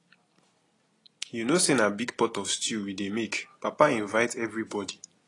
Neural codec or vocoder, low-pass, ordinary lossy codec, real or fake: none; 10.8 kHz; AAC, 32 kbps; real